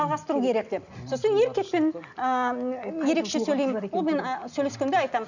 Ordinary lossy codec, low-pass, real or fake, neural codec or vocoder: none; 7.2 kHz; real; none